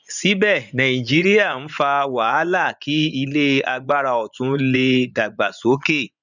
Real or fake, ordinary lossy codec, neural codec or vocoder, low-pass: fake; none; vocoder, 24 kHz, 100 mel bands, Vocos; 7.2 kHz